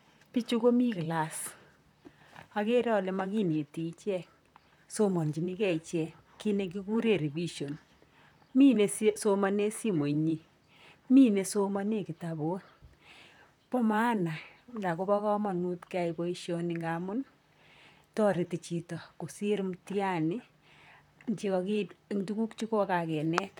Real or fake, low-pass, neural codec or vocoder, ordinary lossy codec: fake; 19.8 kHz; vocoder, 44.1 kHz, 128 mel bands, Pupu-Vocoder; none